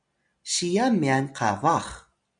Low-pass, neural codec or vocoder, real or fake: 9.9 kHz; none; real